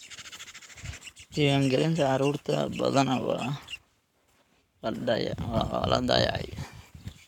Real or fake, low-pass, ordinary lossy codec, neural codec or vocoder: fake; 19.8 kHz; none; codec, 44.1 kHz, 7.8 kbps, Pupu-Codec